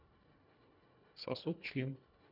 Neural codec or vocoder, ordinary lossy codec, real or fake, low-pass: codec, 24 kHz, 1.5 kbps, HILCodec; none; fake; 5.4 kHz